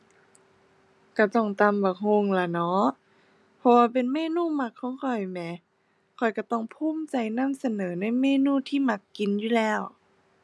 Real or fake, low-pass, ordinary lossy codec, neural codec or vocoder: real; none; none; none